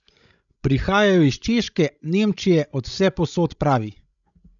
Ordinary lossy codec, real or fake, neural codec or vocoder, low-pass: none; fake; codec, 16 kHz, 16 kbps, FreqCodec, larger model; 7.2 kHz